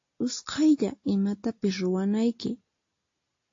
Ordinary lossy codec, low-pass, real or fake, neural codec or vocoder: AAC, 32 kbps; 7.2 kHz; real; none